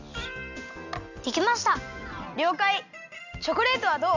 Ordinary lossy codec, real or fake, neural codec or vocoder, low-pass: none; real; none; 7.2 kHz